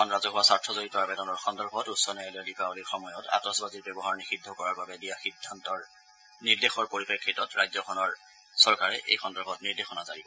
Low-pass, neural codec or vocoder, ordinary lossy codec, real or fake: none; none; none; real